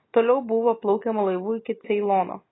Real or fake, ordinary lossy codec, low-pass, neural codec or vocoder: real; AAC, 16 kbps; 7.2 kHz; none